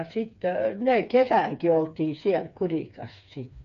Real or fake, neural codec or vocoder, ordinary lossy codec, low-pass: fake; codec, 16 kHz, 4 kbps, FreqCodec, smaller model; none; 7.2 kHz